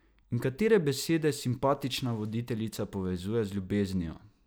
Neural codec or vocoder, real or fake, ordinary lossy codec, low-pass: none; real; none; none